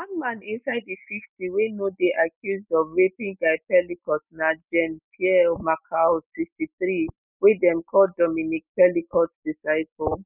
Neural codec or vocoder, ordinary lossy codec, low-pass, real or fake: none; none; 3.6 kHz; real